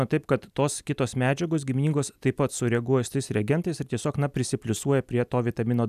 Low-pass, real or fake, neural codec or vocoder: 14.4 kHz; real; none